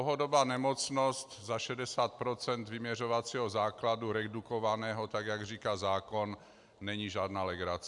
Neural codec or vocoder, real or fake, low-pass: none; real; 10.8 kHz